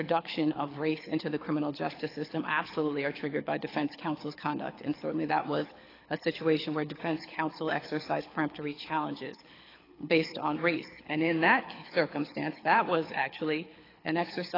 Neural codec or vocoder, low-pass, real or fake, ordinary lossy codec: codec, 24 kHz, 6 kbps, HILCodec; 5.4 kHz; fake; AAC, 24 kbps